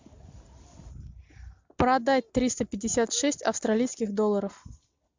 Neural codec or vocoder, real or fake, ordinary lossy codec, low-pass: none; real; MP3, 64 kbps; 7.2 kHz